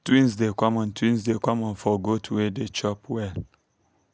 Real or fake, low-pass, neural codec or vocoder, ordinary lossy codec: real; none; none; none